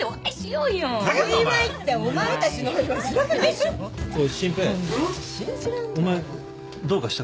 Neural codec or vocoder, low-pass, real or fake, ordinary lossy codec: none; none; real; none